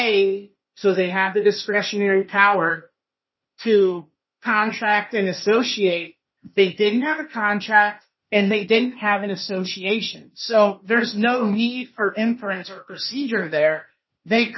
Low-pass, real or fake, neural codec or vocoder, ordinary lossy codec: 7.2 kHz; fake; codec, 16 kHz, 0.8 kbps, ZipCodec; MP3, 24 kbps